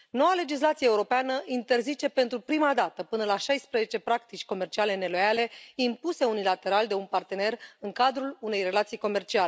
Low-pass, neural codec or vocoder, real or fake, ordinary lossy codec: none; none; real; none